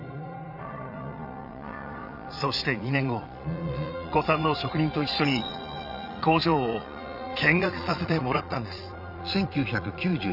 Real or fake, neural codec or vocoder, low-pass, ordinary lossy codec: fake; vocoder, 22.05 kHz, 80 mel bands, Vocos; 5.4 kHz; none